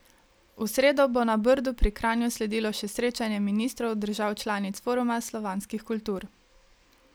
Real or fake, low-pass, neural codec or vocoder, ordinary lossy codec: real; none; none; none